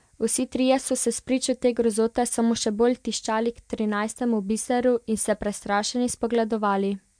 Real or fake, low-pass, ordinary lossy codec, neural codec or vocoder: real; 9.9 kHz; MP3, 64 kbps; none